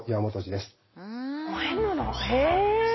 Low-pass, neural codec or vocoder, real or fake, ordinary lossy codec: 7.2 kHz; none; real; MP3, 24 kbps